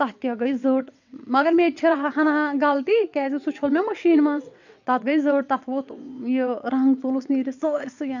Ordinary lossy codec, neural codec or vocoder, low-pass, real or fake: none; vocoder, 22.05 kHz, 80 mel bands, WaveNeXt; 7.2 kHz; fake